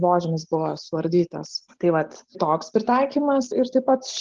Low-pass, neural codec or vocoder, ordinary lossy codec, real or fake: 7.2 kHz; none; Opus, 16 kbps; real